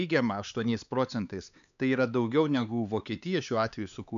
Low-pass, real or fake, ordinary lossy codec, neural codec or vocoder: 7.2 kHz; fake; MP3, 96 kbps; codec, 16 kHz, 4 kbps, X-Codec, HuBERT features, trained on LibriSpeech